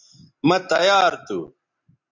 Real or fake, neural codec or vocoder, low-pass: real; none; 7.2 kHz